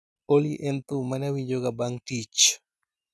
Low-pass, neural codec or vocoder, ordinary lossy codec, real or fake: none; none; none; real